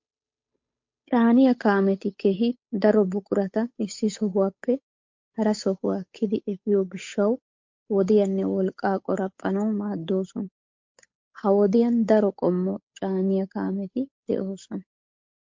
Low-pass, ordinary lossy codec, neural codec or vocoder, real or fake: 7.2 kHz; MP3, 48 kbps; codec, 16 kHz, 8 kbps, FunCodec, trained on Chinese and English, 25 frames a second; fake